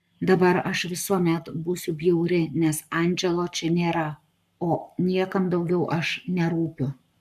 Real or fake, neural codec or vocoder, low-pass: fake; codec, 44.1 kHz, 7.8 kbps, Pupu-Codec; 14.4 kHz